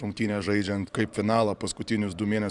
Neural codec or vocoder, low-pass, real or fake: vocoder, 44.1 kHz, 128 mel bands every 512 samples, BigVGAN v2; 10.8 kHz; fake